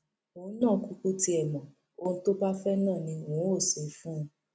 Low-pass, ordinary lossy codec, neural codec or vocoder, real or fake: none; none; none; real